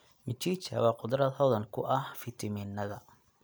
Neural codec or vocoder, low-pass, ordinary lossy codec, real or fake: none; none; none; real